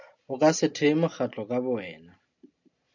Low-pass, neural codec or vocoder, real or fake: 7.2 kHz; none; real